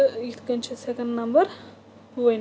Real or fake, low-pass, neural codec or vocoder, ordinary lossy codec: real; none; none; none